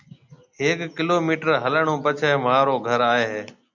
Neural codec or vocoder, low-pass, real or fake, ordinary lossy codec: none; 7.2 kHz; real; MP3, 64 kbps